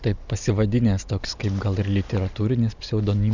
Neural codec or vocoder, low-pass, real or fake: none; 7.2 kHz; real